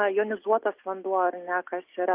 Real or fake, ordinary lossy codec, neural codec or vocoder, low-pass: real; Opus, 32 kbps; none; 3.6 kHz